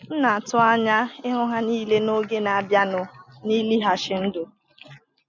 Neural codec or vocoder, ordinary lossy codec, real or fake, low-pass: none; none; real; 7.2 kHz